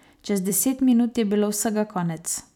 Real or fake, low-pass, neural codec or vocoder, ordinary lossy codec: fake; 19.8 kHz; vocoder, 44.1 kHz, 128 mel bands every 256 samples, BigVGAN v2; none